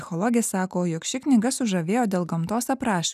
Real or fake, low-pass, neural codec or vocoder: real; 14.4 kHz; none